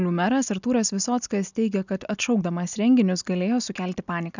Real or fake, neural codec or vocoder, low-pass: real; none; 7.2 kHz